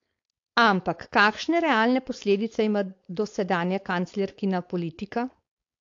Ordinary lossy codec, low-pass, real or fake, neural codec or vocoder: AAC, 48 kbps; 7.2 kHz; fake; codec, 16 kHz, 4.8 kbps, FACodec